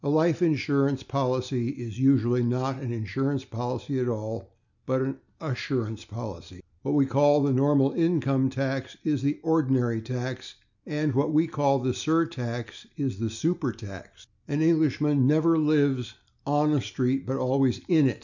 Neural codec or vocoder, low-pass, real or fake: none; 7.2 kHz; real